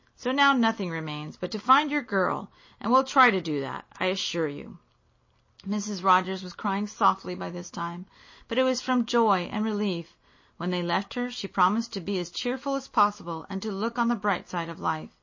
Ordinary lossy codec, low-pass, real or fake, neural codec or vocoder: MP3, 32 kbps; 7.2 kHz; real; none